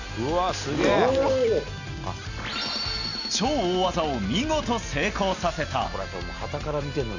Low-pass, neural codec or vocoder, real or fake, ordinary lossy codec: 7.2 kHz; none; real; none